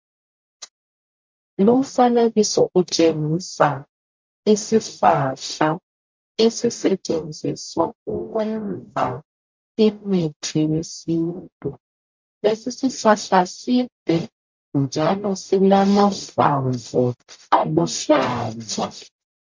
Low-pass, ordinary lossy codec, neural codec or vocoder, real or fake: 7.2 kHz; MP3, 48 kbps; codec, 44.1 kHz, 0.9 kbps, DAC; fake